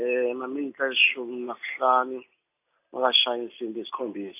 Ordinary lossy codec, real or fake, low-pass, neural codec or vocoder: AAC, 24 kbps; real; 3.6 kHz; none